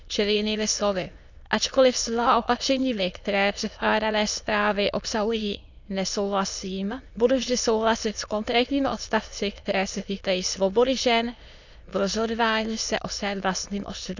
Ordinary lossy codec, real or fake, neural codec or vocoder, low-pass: none; fake; autoencoder, 22.05 kHz, a latent of 192 numbers a frame, VITS, trained on many speakers; 7.2 kHz